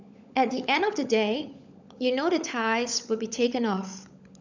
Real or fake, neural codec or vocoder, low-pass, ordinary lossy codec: fake; codec, 16 kHz, 16 kbps, FunCodec, trained on LibriTTS, 50 frames a second; 7.2 kHz; none